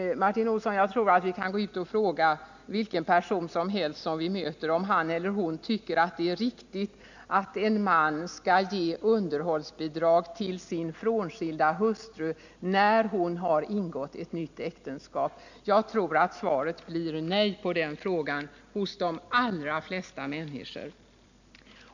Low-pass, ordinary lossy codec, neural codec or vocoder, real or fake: 7.2 kHz; none; none; real